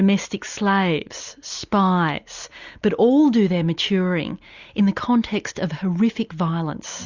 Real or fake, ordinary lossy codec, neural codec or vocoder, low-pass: real; Opus, 64 kbps; none; 7.2 kHz